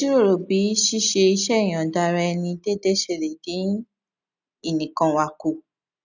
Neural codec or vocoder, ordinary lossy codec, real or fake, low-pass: none; none; real; 7.2 kHz